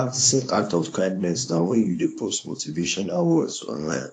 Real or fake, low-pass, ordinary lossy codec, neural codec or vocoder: fake; 9.9 kHz; AAC, 48 kbps; codec, 24 kHz, 0.9 kbps, WavTokenizer, small release